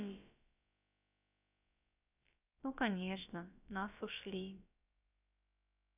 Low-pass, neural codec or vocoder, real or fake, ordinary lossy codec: 3.6 kHz; codec, 16 kHz, about 1 kbps, DyCAST, with the encoder's durations; fake; none